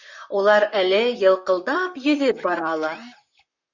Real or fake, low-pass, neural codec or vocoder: fake; 7.2 kHz; vocoder, 44.1 kHz, 128 mel bands, Pupu-Vocoder